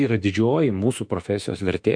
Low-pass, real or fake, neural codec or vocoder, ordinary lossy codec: 9.9 kHz; fake; autoencoder, 48 kHz, 32 numbers a frame, DAC-VAE, trained on Japanese speech; MP3, 64 kbps